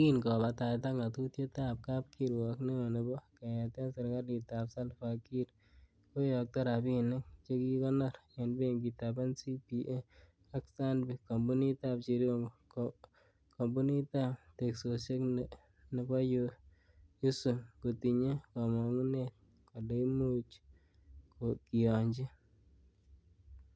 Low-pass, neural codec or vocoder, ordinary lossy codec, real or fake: none; none; none; real